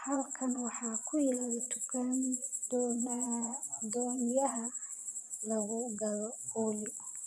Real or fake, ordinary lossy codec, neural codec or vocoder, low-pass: fake; none; vocoder, 22.05 kHz, 80 mel bands, Vocos; none